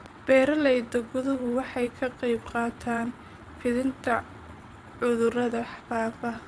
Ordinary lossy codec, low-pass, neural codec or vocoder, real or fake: none; none; vocoder, 22.05 kHz, 80 mel bands, WaveNeXt; fake